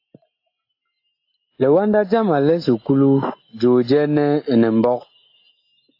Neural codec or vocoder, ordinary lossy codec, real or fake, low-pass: none; AAC, 32 kbps; real; 5.4 kHz